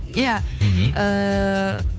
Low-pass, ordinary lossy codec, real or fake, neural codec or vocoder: none; none; fake; codec, 16 kHz, 2 kbps, FunCodec, trained on Chinese and English, 25 frames a second